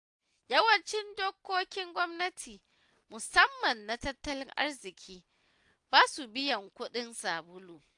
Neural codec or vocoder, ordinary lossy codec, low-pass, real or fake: none; AAC, 64 kbps; 10.8 kHz; real